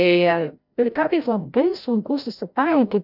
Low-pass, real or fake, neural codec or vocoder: 5.4 kHz; fake; codec, 16 kHz, 0.5 kbps, FreqCodec, larger model